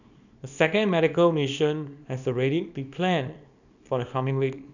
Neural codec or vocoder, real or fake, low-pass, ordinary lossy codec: codec, 24 kHz, 0.9 kbps, WavTokenizer, small release; fake; 7.2 kHz; none